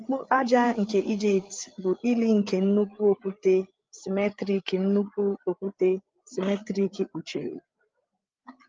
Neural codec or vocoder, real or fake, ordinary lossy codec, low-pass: codec, 16 kHz, 8 kbps, FreqCodec, larger model; fake; Opus, 24 kbps; 7.2 kHz